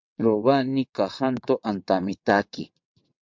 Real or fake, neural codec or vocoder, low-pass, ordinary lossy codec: fake; vocoder, 22.05 kHz, 80 mel bands, WaveNeXt; 7.2 kHz; MP3, 64 kbps